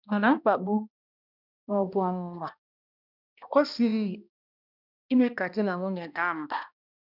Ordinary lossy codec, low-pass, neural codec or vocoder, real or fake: none; 5.4 kHz; codec, 16 kHz, 1 kbps, X-Codec, HuBERT features, trained on balanced general audio; fake